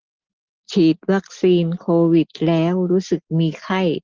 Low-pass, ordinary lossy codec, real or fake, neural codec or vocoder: 7.2 kHz; Opus, 16 kbps; real; none